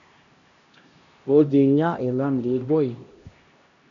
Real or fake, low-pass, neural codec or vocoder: fake; 7.2 kHz; codec, 16 kHz, 1 kbps, X-Codec, HuBERT features, trained on LibriSpeech